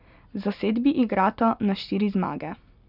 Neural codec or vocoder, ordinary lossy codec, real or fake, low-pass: none; none; real; 5.4 kHz